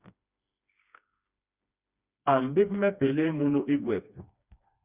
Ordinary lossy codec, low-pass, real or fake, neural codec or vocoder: Opus, 64 kbps; 3.6 kHz; fake; codec, 16 kHz, 2 kbps, FreqCodec, smaller model